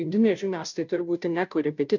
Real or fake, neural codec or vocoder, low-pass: fake; codec, 16 kHz, 0.5 kbps, FunCodec, trained on Chinese and English, 25 frames a second; 7.2 kHz